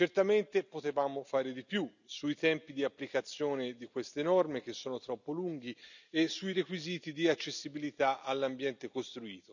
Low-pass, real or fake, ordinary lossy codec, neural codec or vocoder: 7.2 kHz; real; none; none